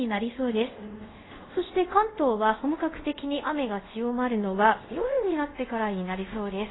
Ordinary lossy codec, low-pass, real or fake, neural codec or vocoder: AAC, 16 kbps; 7.2 kHz; fake; codec, 24 kHz, 0.5 kbps, DualCodec